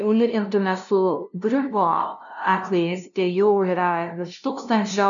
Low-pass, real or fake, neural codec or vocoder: 7.2 kHz; fake; codec, 16 kHz, 0.5 kbps, FunCodec, trained on LibriTTS, 25 frames a second